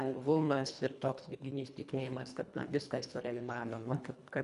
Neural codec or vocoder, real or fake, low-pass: codec, 24 kHz, 1.5 kbps, HILCodec; fake; 10.8 kHz